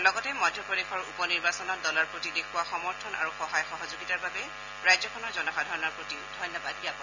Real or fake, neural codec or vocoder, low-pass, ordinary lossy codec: real; none; 7.2 kHz; none